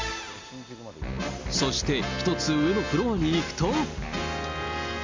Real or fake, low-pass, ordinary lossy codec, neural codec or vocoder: real; 7.2 kHz; MP3, 64 kbps; none